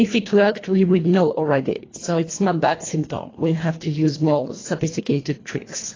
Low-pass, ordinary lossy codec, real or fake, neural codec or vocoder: 7.2 kHz; AAC, 32 kbps; fake; codec, 24 kHz, 1.5 kbps, HILCodec